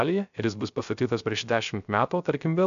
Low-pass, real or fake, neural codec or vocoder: 7.2 kHz; fake; codec, 16 kHz, 0.3 kbps, FocalCodec